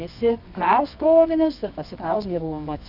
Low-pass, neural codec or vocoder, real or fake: 5.4 kHz; codec, 24 kHz, 0.9 kbps, WavTokenizer, medium music audio release; fake